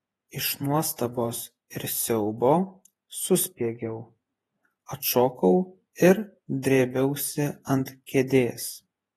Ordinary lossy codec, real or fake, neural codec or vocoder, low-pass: AAC, 32 kbps; fake; vocoder, 48 kHz, 128 mel bands, Vocos; 19.8 kHz